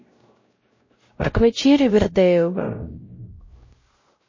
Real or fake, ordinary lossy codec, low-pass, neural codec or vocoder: fake; MP3, 32 kbps; 7.2 kHz; codec, 16 kHz, 0.5 kbps, X-Codec, WavLM features, trained on Multilingual LibriSpeech